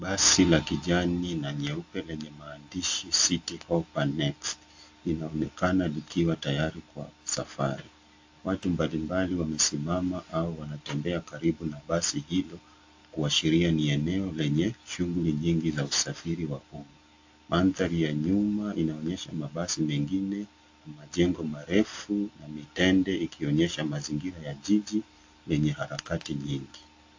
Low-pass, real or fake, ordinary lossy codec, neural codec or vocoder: 7.2 kHz; real; AAC, 48 kbps; none